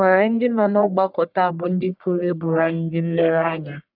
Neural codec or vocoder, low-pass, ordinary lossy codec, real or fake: codec, 44.1 kHz, 1.7 kbps, Pupu-Codec; 5.4 kHz; none; fake